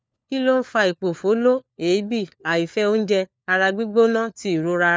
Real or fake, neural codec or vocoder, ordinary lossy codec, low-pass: fake; codec, 16 kHz, 4 kbps, FunCodec, trained on LibriTTS, 50 frames a second; none; none